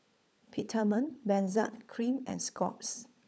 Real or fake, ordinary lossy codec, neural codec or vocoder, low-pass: fake; none; codec, 16 kHz, 16 kbps, FunCodec, trained on LibriTTS, 50 frames a second; none